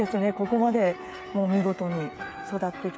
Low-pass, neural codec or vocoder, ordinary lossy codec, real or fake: none; codec, 16 kHz, 8 kbps, FreqCodec, smaller model; none; fake